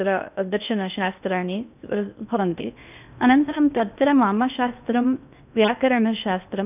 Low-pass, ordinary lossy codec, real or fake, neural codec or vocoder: 3.6 kHz; none; fake; codec, 16 kHz in and 24 kHz out, 0.6 kbps, FocalCodec, streaming, 2048 codes